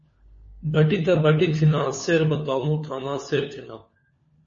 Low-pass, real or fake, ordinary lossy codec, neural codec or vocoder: 7.2 kHz; fake; MP3, 32 kbps; codec, 16 kHz, 4 kbps, FunCodec, trained on LibriTTS, 50 frames a second